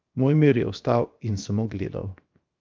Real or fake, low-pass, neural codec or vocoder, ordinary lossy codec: fake; 7.2 kHz; codec, 16 kHz, 0.7 kbps, FocalCodec; Opus, 32 kbps